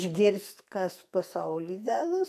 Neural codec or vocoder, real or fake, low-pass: codec, 44.1 kHz, 2.6 kbps, SNAC; fake; 14.4 kHz